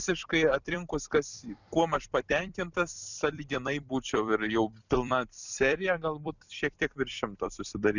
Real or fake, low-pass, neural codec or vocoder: fake; 7.2 kHz; vocoder, 44.1 kHz, 128 mel bands every 256 samples, BigVGAN v2